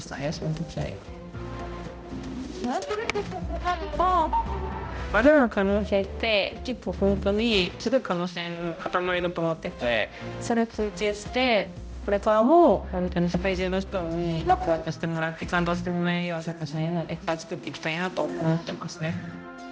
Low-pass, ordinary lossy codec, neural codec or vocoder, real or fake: none; none; codec, 16 kHz, 0.5 kbps, X-Codec, HuBERT features, trained on balanced general audio; fake